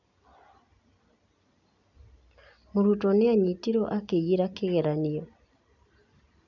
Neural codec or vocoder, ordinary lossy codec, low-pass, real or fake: none; none; 7.2 kHz; real